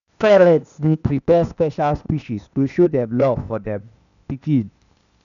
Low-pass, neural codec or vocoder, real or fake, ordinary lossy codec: 7.2 kHz; codec, 16 kHz, 0.8 kbps, ZipCodec; fake; none